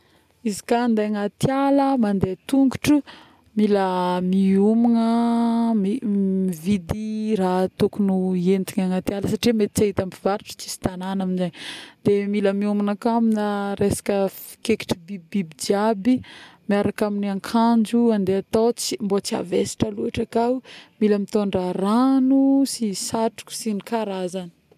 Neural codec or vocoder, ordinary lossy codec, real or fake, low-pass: none; none; real; 14.4 kHz